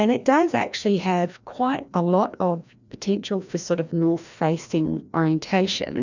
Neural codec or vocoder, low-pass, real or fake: codec, 16 kHz, 1 kbps, FreqCodec, larger model; 7.2 kHz; fake